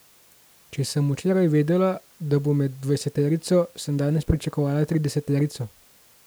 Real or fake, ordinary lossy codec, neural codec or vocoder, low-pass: real; none; none; none